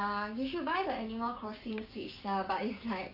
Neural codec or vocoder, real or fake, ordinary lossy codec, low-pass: codec, 16 kHz, 6 kbps, DAC; fake; AAC, 32 kbps; 5.4 kHz